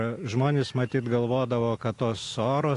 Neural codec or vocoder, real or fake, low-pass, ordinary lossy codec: none; real; 10.8 kHz; AAC, 48 kbps